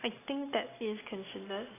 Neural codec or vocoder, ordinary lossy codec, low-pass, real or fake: none; AAC, 16 kbps; 3.6 kHz; real